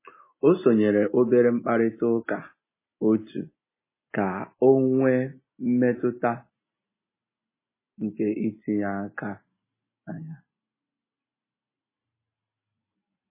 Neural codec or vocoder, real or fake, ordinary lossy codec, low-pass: none; real; MP3, 16 kbps; 3.6 kHz